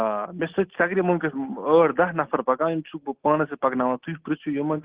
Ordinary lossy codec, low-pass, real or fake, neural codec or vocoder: Opus, 16 kbps; 3.6 kHz; real; none